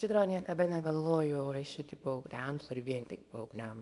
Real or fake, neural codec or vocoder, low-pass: fake; codec, 24 kHz, 0.9 kbps, WavTokenizer, small release; 10.8 kHz